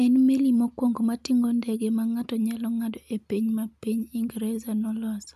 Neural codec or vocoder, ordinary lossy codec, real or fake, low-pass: none; none; real; 14.4 kHz